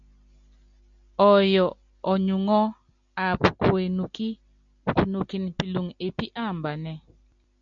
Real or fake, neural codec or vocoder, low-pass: real; none; 7.2 kHz